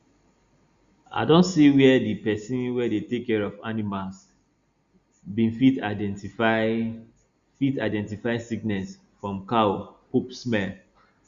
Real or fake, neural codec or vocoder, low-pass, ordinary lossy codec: real; none; 7.2 kHz; Opus, 64 kbps